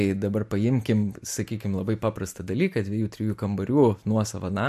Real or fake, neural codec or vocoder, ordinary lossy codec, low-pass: real; none; MP3, 64 kbps; 14.4 kHz